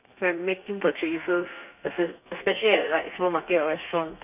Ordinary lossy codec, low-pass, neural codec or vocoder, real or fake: none; 3.6 kHz; codec, 32 kHz, 1.9 kbps, SNAC; fake